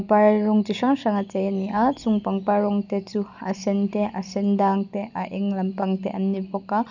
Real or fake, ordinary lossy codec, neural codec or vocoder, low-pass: real; none; none; 7.2 kHz